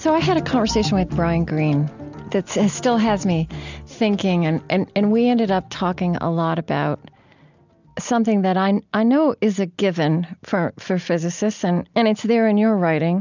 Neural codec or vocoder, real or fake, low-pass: none; real; 7.2 kHz